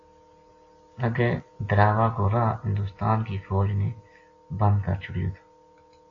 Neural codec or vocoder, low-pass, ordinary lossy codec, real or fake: none; 7.2 kHz; AAC, 32 kbps; real